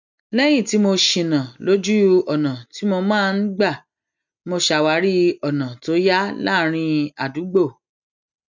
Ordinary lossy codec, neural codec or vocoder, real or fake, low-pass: none; none; real; 7.2 kHz